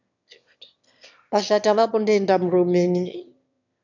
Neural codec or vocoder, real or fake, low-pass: autoencoder, 22.05 kHz, a latent of 192 numbers a frame, VITS, trained on one speaker; fake; 7.2 kHz